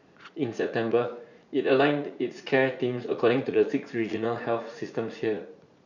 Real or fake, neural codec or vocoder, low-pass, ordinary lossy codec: fake; vocoder, 44.1 kHz, 80 mel bands, Vocos; 7.2 kHz; none